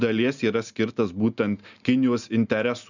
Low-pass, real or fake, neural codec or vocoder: 7.2 kHz; real; none